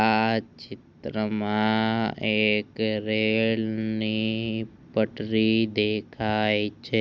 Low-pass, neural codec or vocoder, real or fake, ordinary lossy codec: none; none; real; none